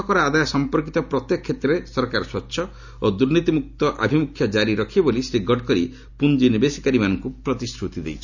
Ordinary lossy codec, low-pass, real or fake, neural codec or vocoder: none; 7.2 kHz; real; none